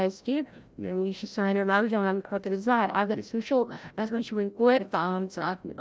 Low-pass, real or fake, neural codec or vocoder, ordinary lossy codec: none; fake; codec, 16 kHz, 0.5 kbps, FreqCodec, larger model; none